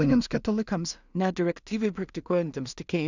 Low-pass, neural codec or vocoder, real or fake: 7.2 kHz; codec, 16 kHz in and 24 kHz out, 0.4 kbps, LongCat-Audio-Codec, two codebook decoder; fake